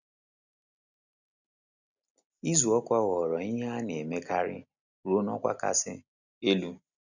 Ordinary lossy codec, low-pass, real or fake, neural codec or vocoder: none; 7.2 kHz; real; none